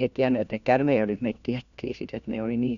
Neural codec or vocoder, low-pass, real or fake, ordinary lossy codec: codec, 16 kHz, 1 kbps, FunCodec, trained on LibriTTS, 50 frames a second; 7.2 kHz; fake; MP3, 96 kbps